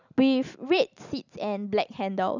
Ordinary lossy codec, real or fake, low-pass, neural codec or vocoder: none; fake; 7.2 kHz; vocoder, 44.1 kHz, 128 mel bands every 256 samples, BigVGAN v2